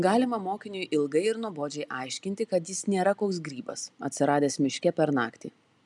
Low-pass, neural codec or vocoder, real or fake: 10.8 kHz; none; real